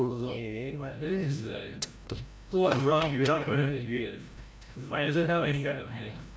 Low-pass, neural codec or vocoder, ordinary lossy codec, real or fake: none; codec, 16 kHz, 0.5 kbps, FreqCodec, larger model; none; fake